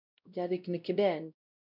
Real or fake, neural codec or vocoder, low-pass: fake; codec, 16 kHz, 0.5 kbps, X-Codec, WavLM features, trained on Multilingual LibriSpeech; 5.4 kHz